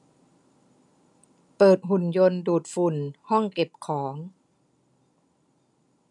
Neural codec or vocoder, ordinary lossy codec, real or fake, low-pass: none; none; real; 10.8 kHz